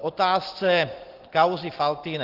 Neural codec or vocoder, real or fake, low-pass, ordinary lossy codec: none; real; 5.4 kHz; Opus, 16 kbps